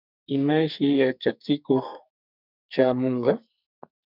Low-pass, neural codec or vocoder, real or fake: 5.4 kHz; codec, 44.1 kHz, 2.6 kbps, SNAC; fake